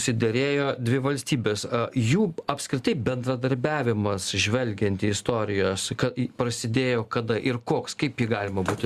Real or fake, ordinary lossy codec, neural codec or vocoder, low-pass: real; Opus, 64 kbps; none; 14.4 kHz